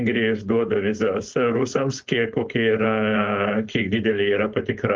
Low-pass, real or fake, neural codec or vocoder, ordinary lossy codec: 7.2 kHz; fake; codec, 16 kHz, 4.8 kbps, FACodec; Opus, 32 kbps